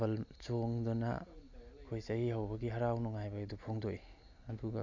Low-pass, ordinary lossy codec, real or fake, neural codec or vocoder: 7.2 kHz; MP3, 64 kbps; real; none